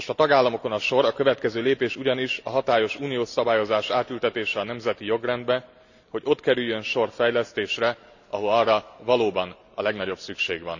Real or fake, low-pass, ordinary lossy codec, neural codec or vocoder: real; 7.2 kHz; none; none